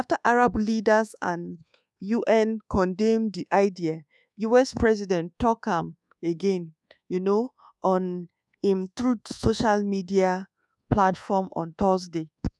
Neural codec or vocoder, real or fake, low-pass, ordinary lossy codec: codec, 24 kHz, 1.2 kbps, DualCodec; fake; none; none